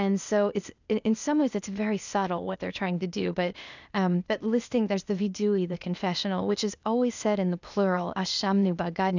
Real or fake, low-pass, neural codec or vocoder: fake; 7.2 kHz; codec, 16 kHz, 0.8 kbps, ZipCodec